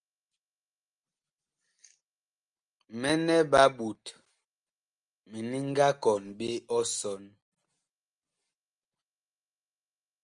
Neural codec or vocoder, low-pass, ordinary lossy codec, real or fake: none; 9.9 kHz; Opus, 32 kbps; real